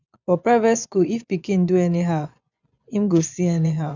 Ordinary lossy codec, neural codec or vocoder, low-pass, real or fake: none; vocoder, 24 kHz, 100 mel bands, Vocos; 7.2 kHz; fake